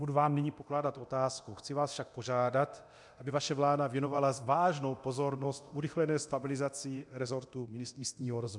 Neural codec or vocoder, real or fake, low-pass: codec, 24 kHz, 0.9 kbps, DualCodec; fake; 10.8 kHz